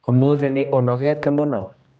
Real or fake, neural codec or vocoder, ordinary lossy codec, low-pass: fake; codec, 16 kHz, 1 kbps, X-Codec, HuBERT features, trained on general audio; none; none